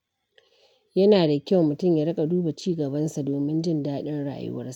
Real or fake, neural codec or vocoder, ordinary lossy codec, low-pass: real; none; none; 19.8 kHz